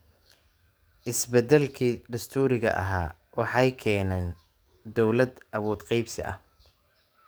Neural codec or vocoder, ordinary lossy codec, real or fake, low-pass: codec, 44.1 kHz, 7.8 kbps, DAC; none; fake; none